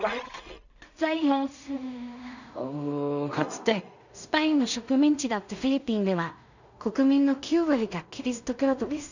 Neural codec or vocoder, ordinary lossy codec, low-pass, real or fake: codec, 16 kHz in and 24 kHz out, 0.4 kbps, LongCat-Audio-Codec, two codebook decoder; none; 7.2 kHz; fake